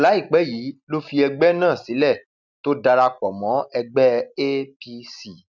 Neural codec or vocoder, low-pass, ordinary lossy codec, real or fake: none; 7.2 kHz; none; real